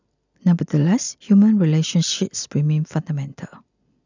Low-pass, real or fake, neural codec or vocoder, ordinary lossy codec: 7.2 kHz; real; none; none